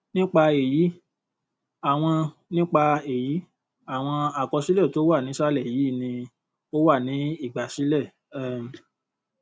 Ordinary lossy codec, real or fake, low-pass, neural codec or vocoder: none; real; none; none